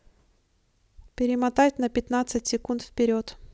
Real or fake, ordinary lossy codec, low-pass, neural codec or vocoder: real; none; none; none